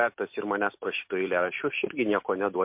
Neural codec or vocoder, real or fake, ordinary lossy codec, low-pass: none; real; MP3, 32 kbps; 3.6 kHz